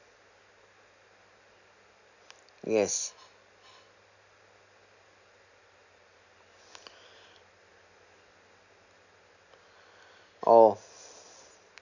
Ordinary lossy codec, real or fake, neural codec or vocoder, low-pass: none; real; none; 7.2 kHz